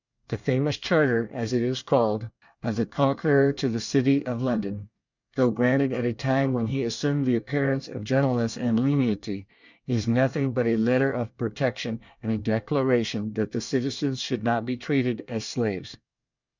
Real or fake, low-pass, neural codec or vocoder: fake; 7.2 kHz; codec, 24 kHz, 1 kbps, SNAC